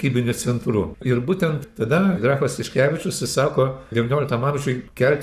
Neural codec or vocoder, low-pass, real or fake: codec, 44.1 kHz, 7.8 kbps, Pupu-Codec; 14.4 kHz; fake